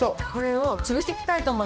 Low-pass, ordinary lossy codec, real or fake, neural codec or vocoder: none; none; fake; codec, 16 kHz, 1 kbps, X-Codec, HuBERT features, trained on balanced general audio